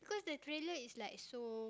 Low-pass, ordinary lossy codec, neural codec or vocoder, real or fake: none; none; none; real